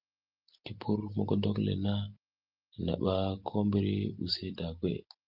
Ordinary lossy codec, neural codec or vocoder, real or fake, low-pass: Opus, 32 kbps; none; real; 5.4 kHz